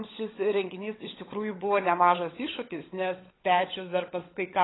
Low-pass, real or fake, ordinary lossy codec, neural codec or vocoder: 7.2 kHz; fake; AAC, 16 kbps; codec, 16 kHz, 16 kbps, FunCodec, trained on LibriTTS, 50 frames a second